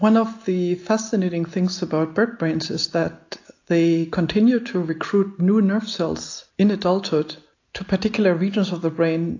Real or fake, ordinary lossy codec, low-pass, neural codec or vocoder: real; AAC, 48 kbps; 7.2 kHz; none